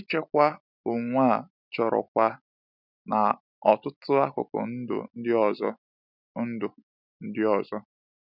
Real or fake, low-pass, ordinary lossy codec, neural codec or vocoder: real; 5.4 kHz; none; none